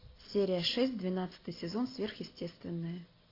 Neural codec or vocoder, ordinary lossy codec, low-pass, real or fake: none; AAC, 24 kbps; 5.4 kHz; real